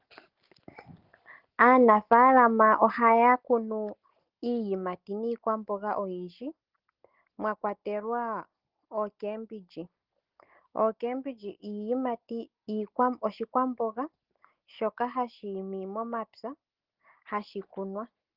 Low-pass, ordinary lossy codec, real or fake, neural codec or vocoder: 5.4 kHz; Opus, 16 kbps; real; none